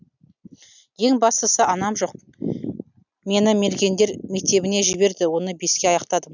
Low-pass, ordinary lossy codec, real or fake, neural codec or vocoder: 7.2 kHz; none; real; none